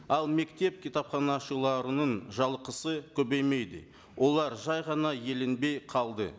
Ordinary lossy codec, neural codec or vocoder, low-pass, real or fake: none; none; none; real